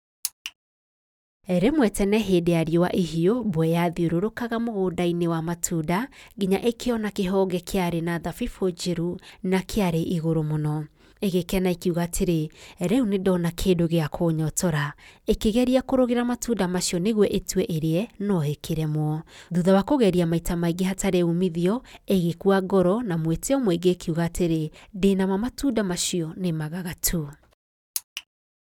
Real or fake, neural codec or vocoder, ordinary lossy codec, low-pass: real; none; none; 19.8 kHz